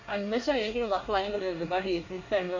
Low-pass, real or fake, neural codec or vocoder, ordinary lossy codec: 7.2 kHz; fake; codec, 24 kHz, 1 kbps, SNAC; none